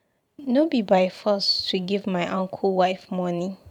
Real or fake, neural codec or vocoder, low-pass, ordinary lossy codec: fake; vocoder, 44.1 kHz, 128 mel bands every 512 samples, BigVGAN v2; 19.8 kHz; none